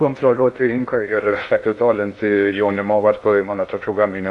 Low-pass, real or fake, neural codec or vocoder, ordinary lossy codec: 10.8 kHz; fake; codec, 16 kHz in and 24 kHz out, 0.6 kbps, FocalCodec, streaming, 2048 codes; AAC, 64 kbps